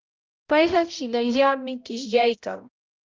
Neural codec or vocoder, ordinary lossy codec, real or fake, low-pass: codec, 16 kHz, 0.5 kbps, X-Codec, HuBERT features, trained on balanced general audio; Opus, 24 kbps; fake; 7.2 kHz